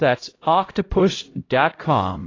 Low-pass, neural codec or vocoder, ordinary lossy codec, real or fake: 7.2 kHz; codec, 16 kHz, 0.5 kbps, X-Codec, HuBERT features, trained on LibriSpeech; AAC, 32 kbps; fake